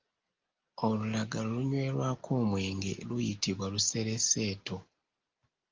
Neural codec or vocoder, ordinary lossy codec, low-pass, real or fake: none; Opus, 24 kbps; 7.2 kHz; real